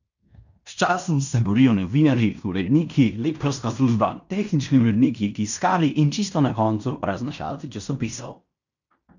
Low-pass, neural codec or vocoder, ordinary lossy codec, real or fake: 7.2 kHz; codec, 16 kHz in and 24 kHz out, 0.9 kbps, LongCat-Audio-Codec, fine tuned four codebook decoder; none; fake